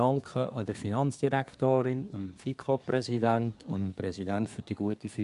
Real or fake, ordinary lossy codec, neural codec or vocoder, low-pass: fake; none; codec, 24 kHz, 1 kbps, SNAC; 10.8 kHz